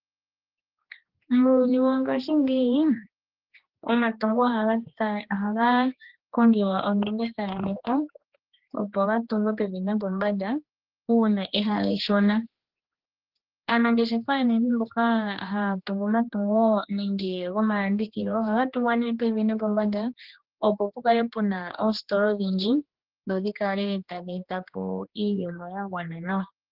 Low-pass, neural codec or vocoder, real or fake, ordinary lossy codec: 5.4 kHz; codec, 16 kHz, 2 kbps, X-Codec, HuBERT features, trained on general audio; fake; Opus, 16 kbps